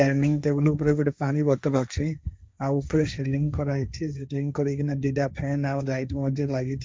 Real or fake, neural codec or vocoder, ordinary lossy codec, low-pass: fake; codec, 16 kHz, 1.1 kbps, Voila-Tokenizer; none; none